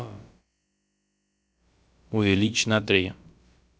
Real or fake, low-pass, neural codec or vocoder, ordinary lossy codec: fake; none; codec, 16 kHz, about 1 kbps, DyCAST, with the encoder's durations; none